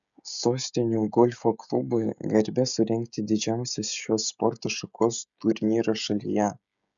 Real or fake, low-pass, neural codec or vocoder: fake; 7.2 kHz; codec, 16 kHz, 16 kbps, FreqCodec, smaller model